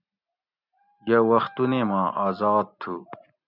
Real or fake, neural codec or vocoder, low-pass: real; none; 5.4 kHz